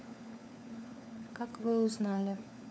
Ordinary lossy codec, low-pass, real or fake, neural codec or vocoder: none; none; fake; codec, 16 kHz, 4 kbps, FunCodec, trained on LibriTTS, 50 frames a second